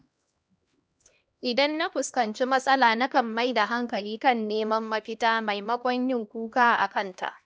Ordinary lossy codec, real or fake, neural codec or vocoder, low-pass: none; fake; codec, 16 kHz, 1 kbps, X-Codec, HuBERT features, trained on LibriSpeech; none